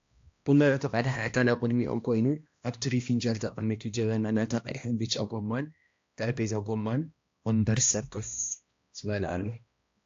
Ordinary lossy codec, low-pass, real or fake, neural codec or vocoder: AAC, 48 kbps; 7.2 kHz; fake; codec, 16 kHz, 1 kbps, X-Codec, HuBERT features, trained on balanced general audio